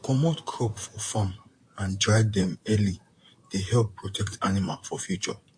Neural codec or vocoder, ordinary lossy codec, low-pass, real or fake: vocoder, 44.1 kHz, 128 mel bands, Pupu-Vocoder; MP3, 48 kbps; 9.9 kHz; fake